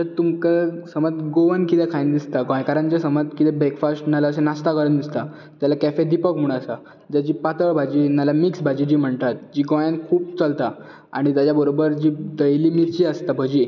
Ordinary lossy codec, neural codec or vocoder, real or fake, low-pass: none; none; real; 7.2 kHz